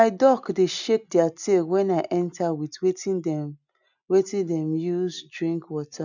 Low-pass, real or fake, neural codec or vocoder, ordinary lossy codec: 7.2 kHz; real; none; none